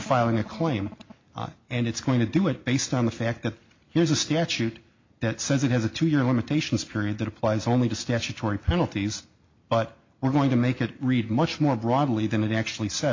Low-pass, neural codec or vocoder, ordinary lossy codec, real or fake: 7.2 kHz; none; MP3, 48 kbps; real